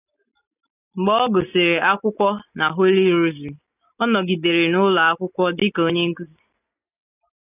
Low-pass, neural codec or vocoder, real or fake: 3.6 kHz; none; real